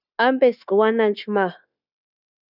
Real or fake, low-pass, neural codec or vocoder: fake; 5.4 kHz; codec, 16 kHz, 0.9 kbps, LongCat-Audio-Codec